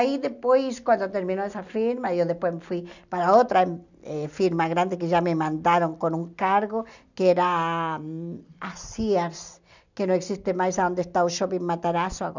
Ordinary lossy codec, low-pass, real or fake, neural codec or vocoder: none; 7.2 kHz; real; none